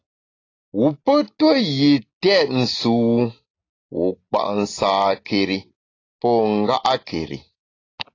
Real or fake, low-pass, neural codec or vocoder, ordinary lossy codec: real; 7.2 kHz; none; AAC, 32 kbps